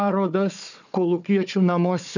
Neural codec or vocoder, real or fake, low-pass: codec, 16 kHz, 4 kbps, FunCodec, trained on Chinese and English, 50 frames a second; fake; 7.2 kHz